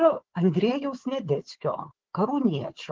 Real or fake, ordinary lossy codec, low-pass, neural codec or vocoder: fake; Opus, 16 kbps; 7.2 kHz; codec, 16 kHz, 4 kbps, FunCodec, trained on Chinese and English, 50 frames a second